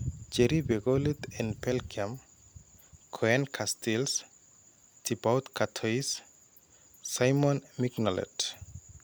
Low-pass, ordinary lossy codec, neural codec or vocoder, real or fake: none; none; none; real